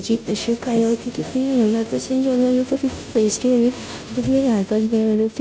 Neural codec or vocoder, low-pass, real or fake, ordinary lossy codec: codec, 16 kHz, 0.5 kbps, FunCodec, trained on Chinese and English, 25 frames a second; none; fake; none